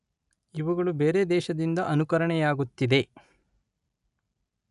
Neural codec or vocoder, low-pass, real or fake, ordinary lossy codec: none; 10.8 kHz; real; none